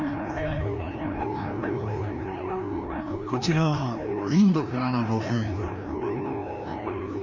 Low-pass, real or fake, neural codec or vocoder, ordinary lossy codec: 7.2 kHz; fake; codec, 16 kHz, 1 kbps, FreqCodec, larger model; none